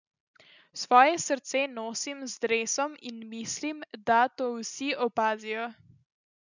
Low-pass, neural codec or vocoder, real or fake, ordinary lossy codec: 7.2 kHz; none; real; none